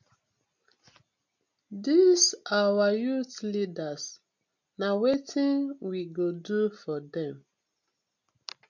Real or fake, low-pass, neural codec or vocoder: real; 7.2 kHz; none